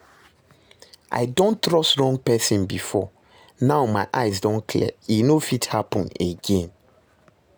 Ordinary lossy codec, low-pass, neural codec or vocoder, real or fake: none; none; none; real